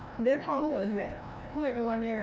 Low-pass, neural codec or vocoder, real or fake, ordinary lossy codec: none; codec, 16 kHz, 1 kbps, FreqCodec, larger model; fake; none